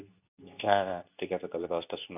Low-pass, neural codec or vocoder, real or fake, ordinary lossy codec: 3.6 kHz; codec, 24 kHz, 0.9 kbps, WavTokenizer, medium speech release version 2; fake; none